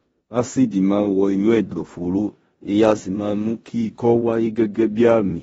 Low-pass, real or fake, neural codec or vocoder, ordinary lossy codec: 10.8 kHz; fake; codec, 16 kHz in and 24 kHz out, 0.9 kbps, LongCat-Audio-Codec, four codebook decoder; AAC, 24 kbps